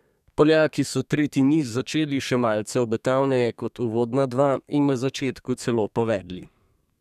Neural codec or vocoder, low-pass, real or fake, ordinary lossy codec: codec, 32 kHz, 1.9 kbps, SNAC; 14.4 kHz; fake; none